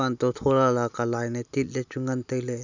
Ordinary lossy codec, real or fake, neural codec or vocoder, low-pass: none; real; none; 7.2 kHz